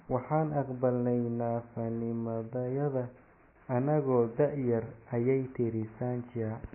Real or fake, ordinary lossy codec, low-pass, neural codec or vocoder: real; MP3, 16 kbps; 3.6 kHz; none